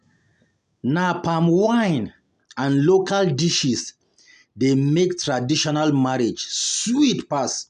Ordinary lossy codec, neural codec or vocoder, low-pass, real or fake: none; none; 10.8 kHz; real